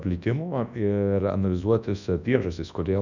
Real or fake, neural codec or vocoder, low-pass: fake; codec, 24 kHz, 0.9 kbps, WavTokenizer, large speech release; 7.2 kHz